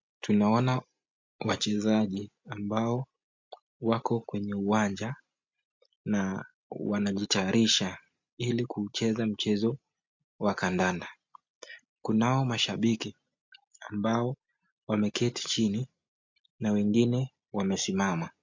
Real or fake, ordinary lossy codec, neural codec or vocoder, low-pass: real; MP3, 64 kbps; none; 7.2 kHz